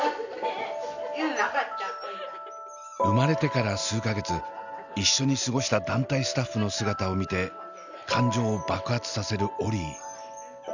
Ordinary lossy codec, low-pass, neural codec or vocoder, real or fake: none; 7.2 kHz; none; real